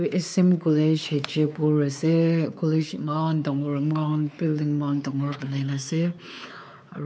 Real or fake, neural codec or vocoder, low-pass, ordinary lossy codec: fake; codec, 16 kHz, 4 kbps, X-Codec, WavLM features, trained on Multilingual LibriSpeech; none; none